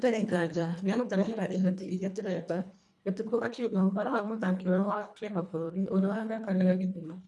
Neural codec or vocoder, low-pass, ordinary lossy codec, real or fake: codec, 24 kHz, 1.5 kbps, HILCodec; none; none; fake